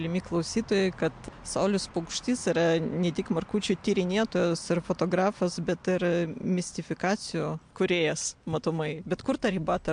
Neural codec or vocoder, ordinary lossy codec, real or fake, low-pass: none; MP3, 64 kbps; real; 9.9 kHz